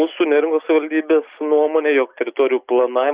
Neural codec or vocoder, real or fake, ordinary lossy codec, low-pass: none; real; Opus, 64 kbps; 3.6 kHz